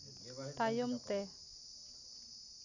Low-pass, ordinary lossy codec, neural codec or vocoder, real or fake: 7.2 kHz; none; none; real